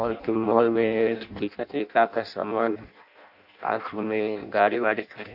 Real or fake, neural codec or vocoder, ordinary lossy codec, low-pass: fake; codec, 16 kHz in and 24 kHz out, 0.6 kbps, FireRedTTS-2 codec; none; 5.4 kHz